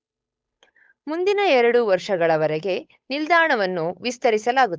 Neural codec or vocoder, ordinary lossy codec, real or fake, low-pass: codec, 16 kHz, 8 kbps, FunCodec, trained on Chinese and English, 25 frames a second; none; fake; none